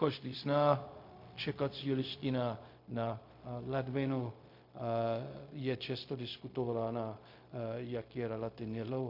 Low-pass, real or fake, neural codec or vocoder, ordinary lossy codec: 5.4 kHz; fake; codec, 16 kHz, 0.4 kbps, LongCat-Audio-Codec; MP3, 32 kbps